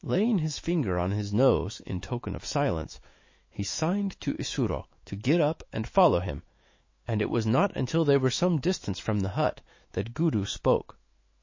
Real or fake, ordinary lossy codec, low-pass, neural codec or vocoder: fake; MP3, 32 kbps; 7.2 kHz; autoencoder, 48 kHz, 128 numbers a frame, DAC-VAE, trained on Japanese speech